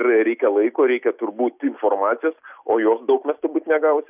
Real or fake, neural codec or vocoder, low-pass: real; none; 3.6 kHz